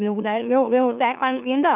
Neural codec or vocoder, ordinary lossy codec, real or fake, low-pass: autoencoder, 44.1 kHz, a latent of 192 numbers a frame, MeloTTS; none; fake; 3.6 kHz